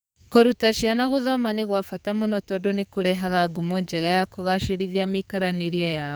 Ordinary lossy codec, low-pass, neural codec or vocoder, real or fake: none; none; codec, 44.1 kHz, 2.6 kbps, SNAC; fake